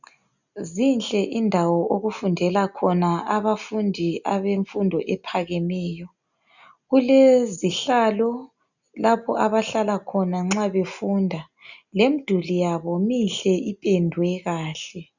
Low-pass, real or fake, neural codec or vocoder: 7.2 kHz; real; none